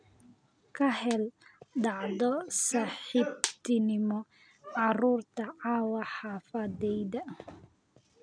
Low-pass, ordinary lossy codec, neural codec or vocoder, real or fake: 9.9 kHz; none; none; real